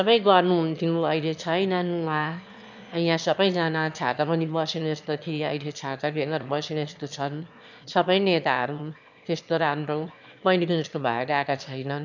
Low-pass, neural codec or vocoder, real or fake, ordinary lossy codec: 7.2 kHz; autoencoder, 22.05 kHz, a latent of 192 numbers a frame, VITS, trained on one speaker; fake; none